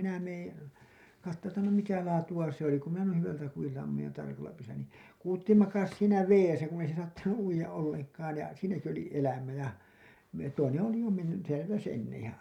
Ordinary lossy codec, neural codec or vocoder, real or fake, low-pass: none; none; real; 19.8 kHz